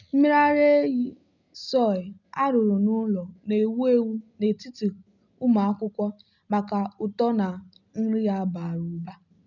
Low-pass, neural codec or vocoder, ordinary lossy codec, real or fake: 7.2 kHz; none; none; real